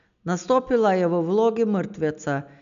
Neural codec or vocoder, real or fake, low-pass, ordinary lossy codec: none; real; 7.2 kHz; none